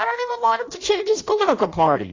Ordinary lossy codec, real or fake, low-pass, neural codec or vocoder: AAC, 32 kbps; fake; 7.2 kHz; codec, 16 kHz in and 24 kHz out, 0.6 kbps, FireRedTTS-2 codec